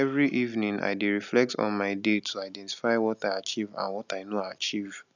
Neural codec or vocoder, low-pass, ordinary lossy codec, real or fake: none; 7.2 kHz; none; real